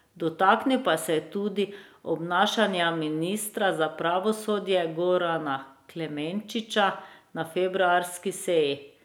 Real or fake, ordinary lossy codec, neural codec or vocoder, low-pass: real; none; none; none